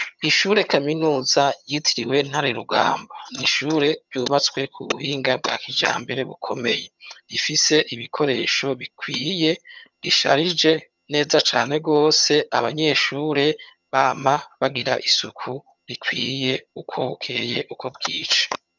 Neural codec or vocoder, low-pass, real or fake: vocoder, 22.05 kHz, 80 mel bands, HiFi-GAN; 7.2 kHz; fake